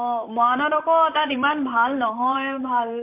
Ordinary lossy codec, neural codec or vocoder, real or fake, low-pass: MP3, 32 kbps; vocoder, 44.1 kHz, 128 mel bands, Pupu-Vocoder; fake; 3.6 kHz